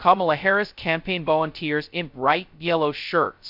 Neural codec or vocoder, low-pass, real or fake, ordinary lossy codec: codec, 16 kHz, 0.2 kbps, FocalCodec; 5.4 kHz; fake; MP3, 48 kbps